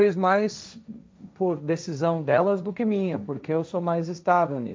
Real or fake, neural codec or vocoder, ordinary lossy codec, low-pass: fake; codec, 16 kHz, 1.1 kbps, Voila-Tokenizer; none; none